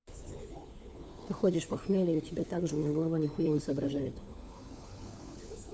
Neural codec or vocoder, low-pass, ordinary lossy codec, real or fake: codec, 16 kHz, 4 kbps, FunCodec, trained on LibriTTS, 50 frames a second; none; none; fake